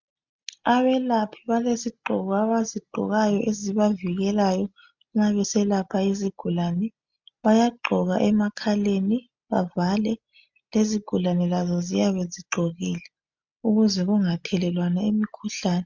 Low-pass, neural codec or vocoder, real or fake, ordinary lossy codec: 7.2 kHz; none; real; MP3, 64 kbps